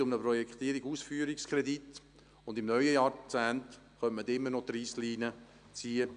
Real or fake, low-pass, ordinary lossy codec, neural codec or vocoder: real; 9.9 kHz; MP3, 96 kbps; none